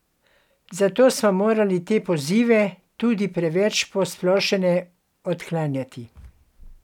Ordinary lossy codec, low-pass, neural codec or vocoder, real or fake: none; 19.8 kHz; none; real